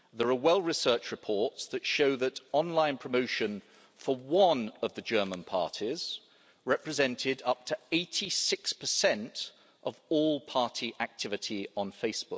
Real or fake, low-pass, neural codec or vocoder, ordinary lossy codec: real; none; none; none